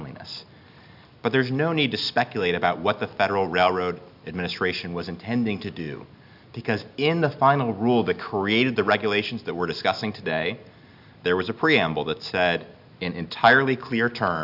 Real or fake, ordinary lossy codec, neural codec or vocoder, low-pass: real; AAC, 48 kbps; none; 5.4 kHz